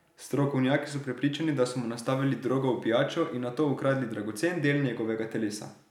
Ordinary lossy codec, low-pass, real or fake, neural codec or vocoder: none; 19.8 kHz; real; none